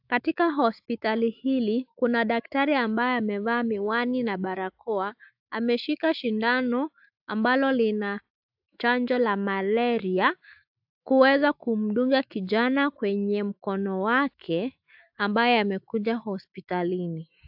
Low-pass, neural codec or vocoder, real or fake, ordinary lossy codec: 5.4 kHz; autoencoder, 48 kHz, 128 numbers a frame, DAC-VAE, trained on Japanese speech; fake; AAC, 48 kbps